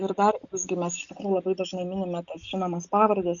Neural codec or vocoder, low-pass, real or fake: none; 7.2 kHz; real